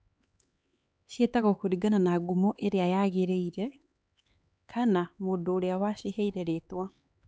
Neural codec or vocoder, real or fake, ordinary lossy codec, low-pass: codec, 16 kHz, 4 kbps, X-Codec, HuBERT features, trained on LibriSpeech; fake; none; none